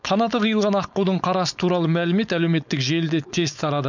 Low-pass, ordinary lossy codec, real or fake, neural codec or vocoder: 7.2 kHz; none; fake; codec, 16 kHz, 4.8 kbps, FACodec